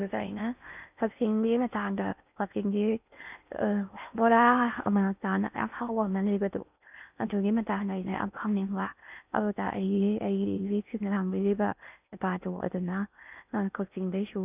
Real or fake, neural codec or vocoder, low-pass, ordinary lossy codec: fake; codec, 16 kHz in and 24 kHz out, 0.6 kbps, FocalCodec, streaming, 4096 codes; 3.6 kHz; none